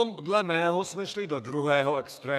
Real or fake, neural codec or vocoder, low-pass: fake; codec, 32 kHz, 1.9 kbps, SNAC; 14.4 kHz